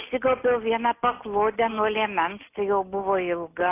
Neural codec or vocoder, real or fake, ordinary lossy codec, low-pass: none; real; AAC, 24 kbps; 3.6 kHz